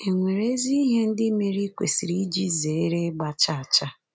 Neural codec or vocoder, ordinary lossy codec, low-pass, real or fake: none; none; none; real